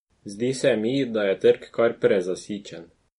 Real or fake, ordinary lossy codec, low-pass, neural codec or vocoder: real; MP3, 48 kbps; 19.8 kHz; none